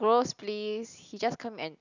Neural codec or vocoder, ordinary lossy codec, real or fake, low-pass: none; none; real; 7.2 kHz